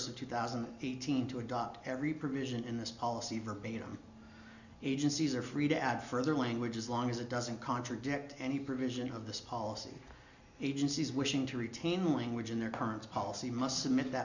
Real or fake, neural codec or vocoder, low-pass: real; none; 7.2 kHz